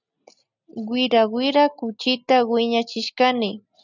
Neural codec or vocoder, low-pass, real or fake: none; 7.2 kHz; real